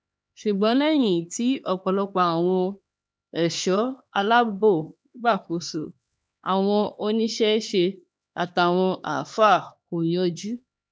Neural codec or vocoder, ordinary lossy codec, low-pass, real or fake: codec, 16 kHz, 2 kbps, X-Codec, HuBERT features, trained on LibriSpeech; none; none; fake